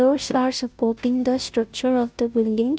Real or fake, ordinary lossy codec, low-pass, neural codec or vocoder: fake; none; none; codec, 16 kHz, 0.5 kbps, FunCodec, trained on Chinese and English, 25 frames a second